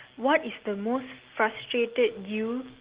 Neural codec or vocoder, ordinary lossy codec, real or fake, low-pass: none; Opus, 16 kbps; real; 3.6 kHz